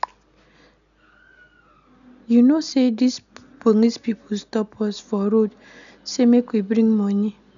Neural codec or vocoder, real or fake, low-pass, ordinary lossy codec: none; real; 7.2 kHz; none